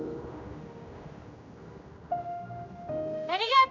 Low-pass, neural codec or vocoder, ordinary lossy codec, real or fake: 7.2 kHz; codec, 16 kHz, 1 kbps, X-Codec, HuBERT features, trained on general audio; MP3, 64 kbps; fake